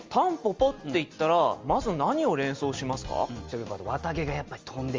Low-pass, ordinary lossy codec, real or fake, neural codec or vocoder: 7.2 kHz; Opus, 32 kbps; real; none